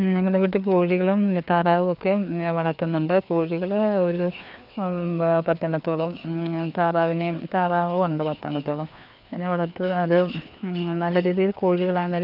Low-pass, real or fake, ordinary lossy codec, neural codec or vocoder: 5.4 kHz; fake; none; codec, 16 kHz, 2 kbps, FreqCodec, larger model